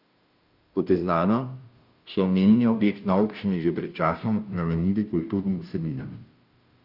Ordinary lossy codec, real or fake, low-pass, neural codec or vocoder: Opus, 32 kbps; fake; 5.4 kHz; codec, 16 kHz, 0.5 kbps, FunCodec, trained on Chinese and English, 25 frames a second